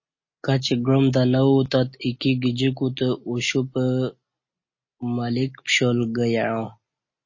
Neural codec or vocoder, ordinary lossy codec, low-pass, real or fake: none; MP3, 32 kbps; 7.2 kHz; real